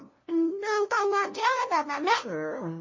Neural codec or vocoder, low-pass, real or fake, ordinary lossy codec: codec, 16 kHz, 0.5 kbps, FunCodec, trained on LibriTTS, 25 frames a second; 7.2 kHz; fake; MP3, 32 kbps